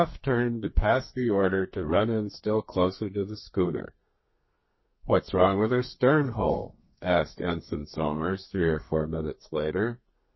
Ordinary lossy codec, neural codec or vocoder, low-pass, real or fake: MP3, 24 kbps; codec, 32 kHz, 1.9 kbps, SNAC; 7.2 kHz; fake